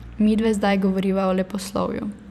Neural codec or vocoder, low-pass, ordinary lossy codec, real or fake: vocoder, 44.1 kHz, 128 mel bands every 512 samples, BigVGAN v2; 14.4 kHz; none; fake